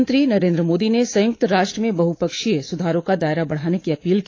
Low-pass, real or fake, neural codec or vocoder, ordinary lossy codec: 7.2 kHz; fake; codec, 24 kHz, 3.1 kbps, DualCodec; AAC, 32 kbps